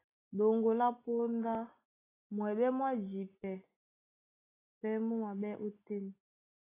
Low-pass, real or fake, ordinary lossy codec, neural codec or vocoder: 3.6 kHz; real; AAC, 16 kbps; none